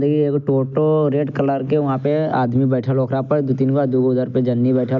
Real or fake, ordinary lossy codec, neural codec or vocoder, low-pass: real; none; none; 7.2 kHz